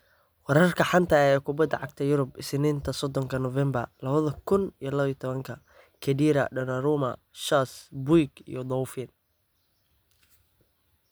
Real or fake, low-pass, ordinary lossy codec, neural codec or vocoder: real; none; none; none